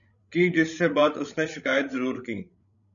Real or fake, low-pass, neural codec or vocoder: fake; 7.2 kHz; codec, 16 kHz, 16 kbps, FreqCodec, larger model